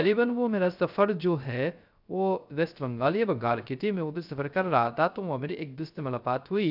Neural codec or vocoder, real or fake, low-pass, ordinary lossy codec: codec, 16 kHz, 0.3 kbps, FocalCodec; fake; 5.4 kHz; none